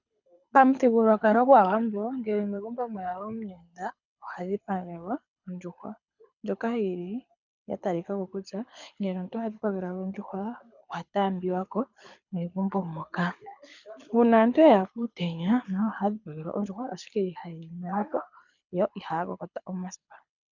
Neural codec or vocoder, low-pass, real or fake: codec, 24 kHz, 6 kbps, HILCodec; 7.2 kHz; fake